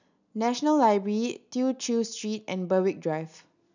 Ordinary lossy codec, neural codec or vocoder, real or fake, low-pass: none; none; real; 7.2 kHz